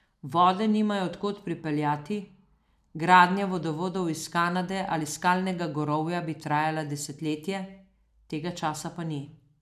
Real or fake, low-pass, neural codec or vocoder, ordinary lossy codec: real; 14.4 kHz; none; none